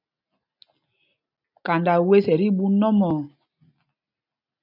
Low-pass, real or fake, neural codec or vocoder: 5.4 kHz; real; none